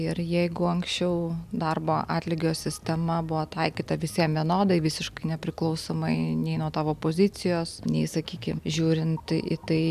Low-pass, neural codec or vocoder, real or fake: 14.4 kHz; none; real